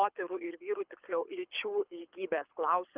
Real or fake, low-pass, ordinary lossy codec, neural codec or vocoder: fake; 3.6 kHz; Opus, 24 kbps; codec, 24 kHz, 6 kbps, HILCodec